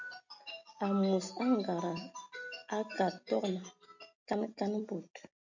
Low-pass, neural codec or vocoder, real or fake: 7.2 kHz; none; real